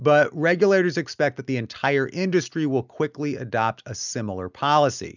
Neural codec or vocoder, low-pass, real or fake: none; 7.2 kHz; real